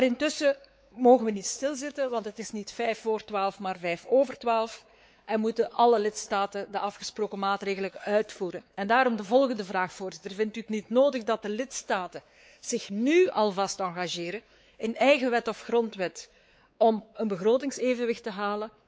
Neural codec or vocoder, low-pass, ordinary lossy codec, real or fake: codec, 16 kHz, 4 kbps, X-Codec, WavLM features, trained on Multilingual LibriSpeech; none; none; fake